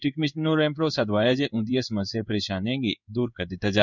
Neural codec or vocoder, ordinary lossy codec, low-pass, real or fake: codec, 16 kHz in and 24 kHz out, 1 kbps, XY-Tokenizer; none; 7.2 kHz; fake